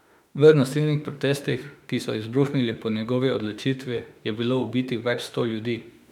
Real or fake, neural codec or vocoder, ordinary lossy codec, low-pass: fake; autoencoder, 48 kHz, 32 numbers a frame, DAC-VAE, trained on Japanese speech; none; 19.8 kHz